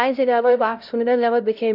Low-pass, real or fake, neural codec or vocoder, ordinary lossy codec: 5.4 kHz; fake; codec, 16 kHz, 0.5 kbps, X-Codec, HuBERT features, trained on LibriSpeech; none